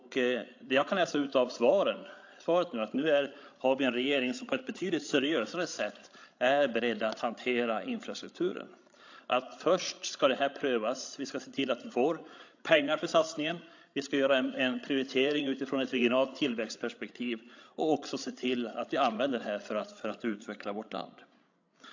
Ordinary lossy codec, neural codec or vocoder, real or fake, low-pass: AAC, 48 kbps; codec, 16 kHz, 16 kbps, FreqCodec, larger model; fake; 7.2 kHz